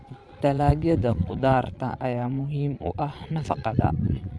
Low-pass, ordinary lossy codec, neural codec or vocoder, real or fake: none; none; vocoder, 22.05 kHz, 80 mel bands, Vocos; fake